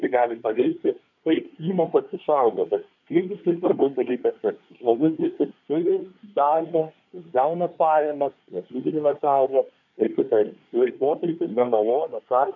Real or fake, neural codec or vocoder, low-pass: fake; codec, 24 kHz, 1 kbps, SNAC; 7.2 kHz